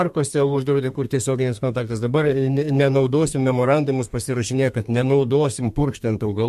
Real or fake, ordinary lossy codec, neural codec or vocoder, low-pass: fake; MP3, 64 kbps; codec, 32 kHz, 1.9 kbps, SNAC; 14.4 kHz